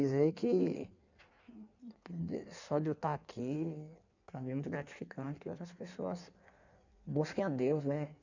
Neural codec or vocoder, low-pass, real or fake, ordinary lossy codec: codec, 16 kHz in and 24 kHz out, 1.1 kbps, FireRedTTS-2 codec; 7.2 kHz; fake; none